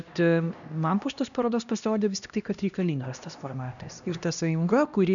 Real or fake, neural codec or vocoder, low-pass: fake; codec, 16 kHz, 1 kbps, X-Codec, HuBERT features, trained on LibriSpeech; 7.2 kHz